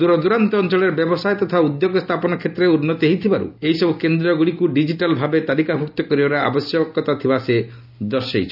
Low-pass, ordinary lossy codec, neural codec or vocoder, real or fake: 5.4 kHz; none; none; real